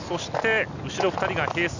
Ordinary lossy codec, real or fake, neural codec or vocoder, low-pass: none; real; none; 7.2 kHz